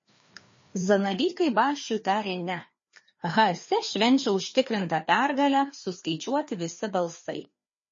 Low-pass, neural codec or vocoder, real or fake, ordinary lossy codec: 7.2 kHz; codec, 16 kHz, 2 kbps, FreqCodec, larger model; fake; MP3, 32 kbps